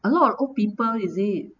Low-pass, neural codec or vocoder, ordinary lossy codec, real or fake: 7.2 kHz; none; none; real